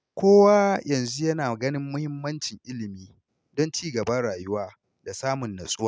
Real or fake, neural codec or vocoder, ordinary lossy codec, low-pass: real; none; none; none